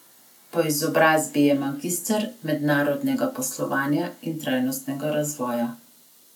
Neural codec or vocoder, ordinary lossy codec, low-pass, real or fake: none; none; 19.8 kHz; real